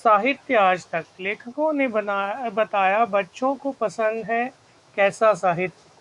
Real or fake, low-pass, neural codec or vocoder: fake; 10.8 kHz; autoencoder, 48 kHz, 128 numbers a frame, DAC-VAE, trained on Japanese speech